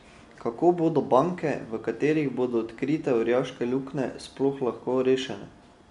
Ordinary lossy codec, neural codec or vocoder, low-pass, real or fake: MP3, 64 kbps; none; 10.8 kHz; real